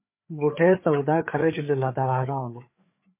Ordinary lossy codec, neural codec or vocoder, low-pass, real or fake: MP3, 24 kbps; codec, 16 kHz, 4 kbps, FreqCodec, larger model; 3.6 kHz; fake